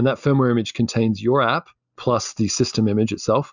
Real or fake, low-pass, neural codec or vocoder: real; 7.2 kHz; none